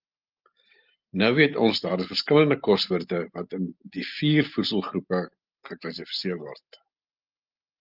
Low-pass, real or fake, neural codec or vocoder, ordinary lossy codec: 5.4 kHz; real; none; Opus, 24 kbps